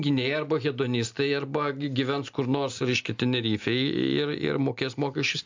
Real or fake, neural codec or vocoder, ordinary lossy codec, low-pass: real; none; MP3, 64 kbps; 7.2 kHz